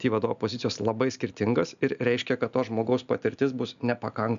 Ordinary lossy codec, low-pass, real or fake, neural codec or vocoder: AAC, 96 kbps; 7.2 kHz; real; none